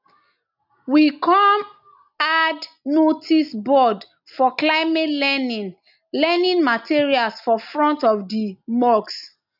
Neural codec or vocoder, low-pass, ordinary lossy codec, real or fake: none; 5.4 kHz; none; real